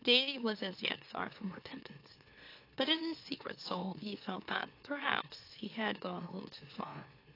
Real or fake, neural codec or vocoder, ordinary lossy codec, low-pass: fake; autoencoder, 44.1 kHz, a latent of 192 numbers a frame, MeloTTS; AAC, 32 kbps; 5.4 kHz